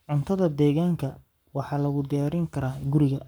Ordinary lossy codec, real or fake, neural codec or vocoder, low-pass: none; fake; codec, 44.1 kHz, 7.8 kbps, Pupu-Codec; none